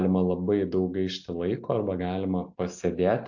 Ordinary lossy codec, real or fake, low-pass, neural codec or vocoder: AAC, 48 kbps; real; 7.2 kHz; none